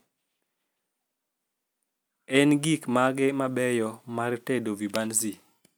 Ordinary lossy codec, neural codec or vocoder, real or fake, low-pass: none; none; real; none